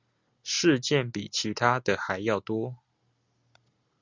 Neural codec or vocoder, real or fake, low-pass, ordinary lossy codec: none; real; 7.2 kHz; Opus, 64 kbps